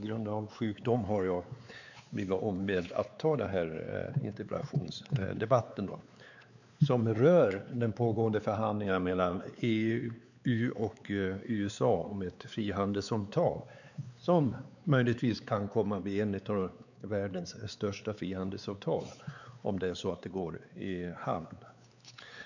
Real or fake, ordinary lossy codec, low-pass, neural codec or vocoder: fake; none; 7.2 kHz; codec, 16 kHz, 4 kbps, X-Codec, WavLM features, trained on Multilingual LibriSpeech